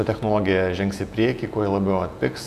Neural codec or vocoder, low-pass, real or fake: vocoder, 48 kHz, 128 mel bands, Vocos; 14.4 kHz; fake